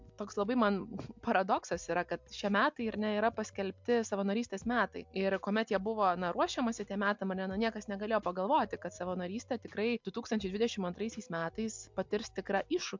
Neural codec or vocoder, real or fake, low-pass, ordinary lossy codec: none; real; 7.2 kHz; MP3, 64 kbps